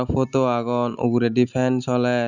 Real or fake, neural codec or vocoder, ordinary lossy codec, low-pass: real; none; none; 7.2 kHz